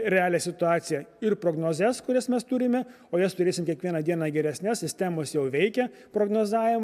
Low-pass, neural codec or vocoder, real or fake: 14.4 kHz; none; real